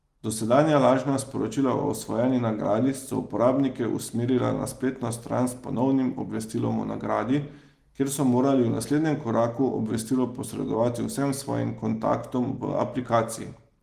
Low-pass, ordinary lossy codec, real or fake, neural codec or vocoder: 14.4 kHz; Opus, 24 kbps; real; none